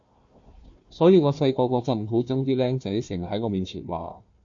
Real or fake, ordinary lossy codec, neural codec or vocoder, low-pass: fake; MP3, 48 kbps; codec, 16 kHz, 1 kbps, FunCodec, trained on Chinese and English, 50 frames a second; 7.2 kHz